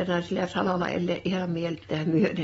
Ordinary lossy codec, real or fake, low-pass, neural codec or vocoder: AAC, 24 kbps; real; 19.8 kHz; none